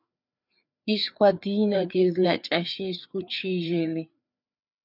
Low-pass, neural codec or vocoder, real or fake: 5.4 kHz; codec, 16 kHz, 4 kbps, FreqCodec, larger model; fake